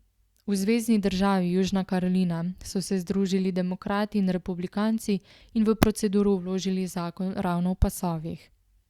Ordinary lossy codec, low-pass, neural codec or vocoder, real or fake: Opus, 64 kbps; 19.8 kHz; none; real